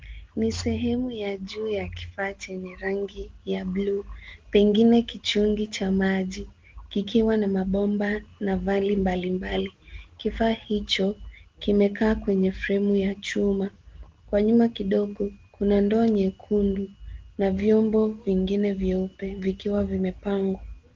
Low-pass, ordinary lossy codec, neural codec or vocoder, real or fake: 7.2 kHz; Opus, 16 kbps; none; real